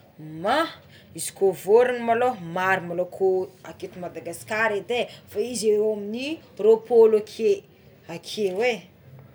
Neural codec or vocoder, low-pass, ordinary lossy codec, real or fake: none; none; none; real